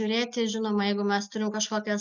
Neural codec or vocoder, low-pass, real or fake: none; 7.2 kHz; real